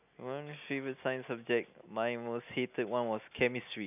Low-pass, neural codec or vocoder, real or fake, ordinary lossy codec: 3.6 kHz; none; real; none